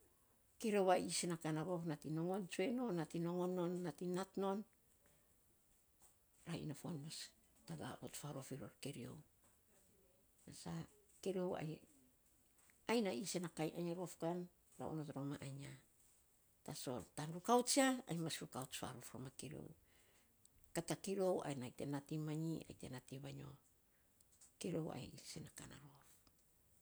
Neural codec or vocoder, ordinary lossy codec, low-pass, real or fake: none; none; none; real